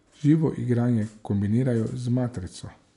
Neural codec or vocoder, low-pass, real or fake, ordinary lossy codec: none; 10.8 kHz; real; none